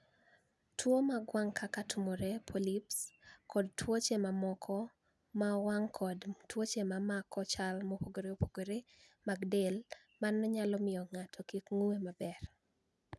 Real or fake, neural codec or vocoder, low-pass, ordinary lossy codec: real; none; none; none